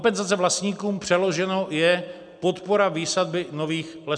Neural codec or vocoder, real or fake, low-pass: none; real; 9.9 kHz